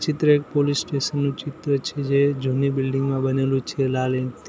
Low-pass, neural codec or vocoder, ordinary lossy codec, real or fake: none; none; none; real